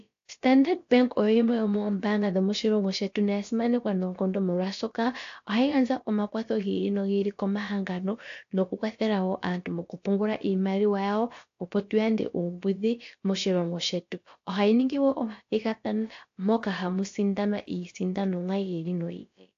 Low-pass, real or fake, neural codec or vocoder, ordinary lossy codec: 7.2 kHz; fake; codec, 16 kHz, about 1 kbps, DyCAST, with the encoder's durations; AAC, 48 kbps